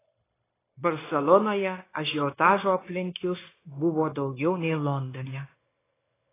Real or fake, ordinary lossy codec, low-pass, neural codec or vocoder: fake; AAC, 16 kbps; 3.6 kHz; codec, 16 kHz, 0.9 kbps, LongCat-Audio-Codec